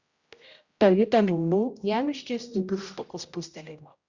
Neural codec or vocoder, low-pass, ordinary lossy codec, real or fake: codec, 16 kHz, 0.5 kbps, X-Codec, HuBERT features, trained on general audio; 7.2 kHz; Opus, 64 kbps; fake